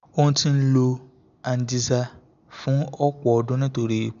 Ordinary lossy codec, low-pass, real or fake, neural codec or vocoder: MP3, 64 kbps; 7.2 kHz; real; none